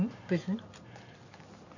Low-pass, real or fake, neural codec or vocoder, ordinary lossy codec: 7.2 kHz; fake; vocoder, 44.1 kHz, 128 mel bands every 512 samples, BigVGAN v2; none